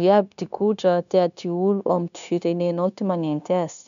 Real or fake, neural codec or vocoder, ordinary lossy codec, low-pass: fake; codec, 16 kHz, 0.9 kbps, LongCat-Audio-Codec; none; 7.2 kHz